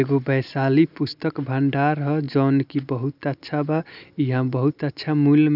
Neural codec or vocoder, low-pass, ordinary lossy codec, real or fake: none; 5.4 kHz; none; real